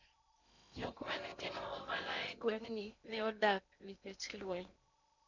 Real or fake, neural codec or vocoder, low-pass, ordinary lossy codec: fake; codec, 16 kHz in and 24 kHz out, 0.8 kbps, FocalCodec, streaming, 65536 codes; 7.2 kHz; none